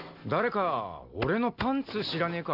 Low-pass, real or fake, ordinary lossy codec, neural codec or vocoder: 5.4 kHz; real; none; none